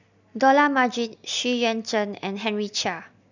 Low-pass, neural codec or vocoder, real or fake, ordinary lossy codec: 7.2 kHz; none; real; none